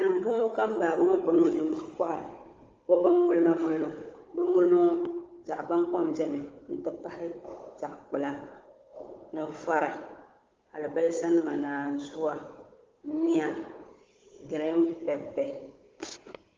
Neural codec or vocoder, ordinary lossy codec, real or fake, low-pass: codec, 16 kHz, 4 kbps, FunCodec, trained on Chinese and English, 50 frames a second; Opus, 32 kbps; fake; 7.2 kHz